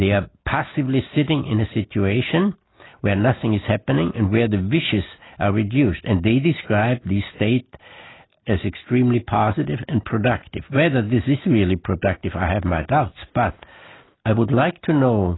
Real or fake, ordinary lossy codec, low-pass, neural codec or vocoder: real; AAC, 16 kbps; 7.2 kHz; none